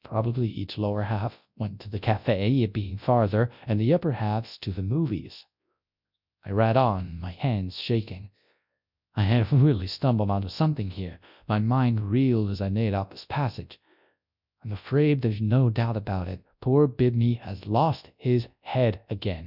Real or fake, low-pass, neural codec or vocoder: fake; 5.4 kHz; codec, 24 kHz, 0.9 kbps, WavTokenizer, large speech release